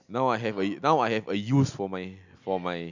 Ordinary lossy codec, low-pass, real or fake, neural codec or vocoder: none; 7.2 kHz; real; none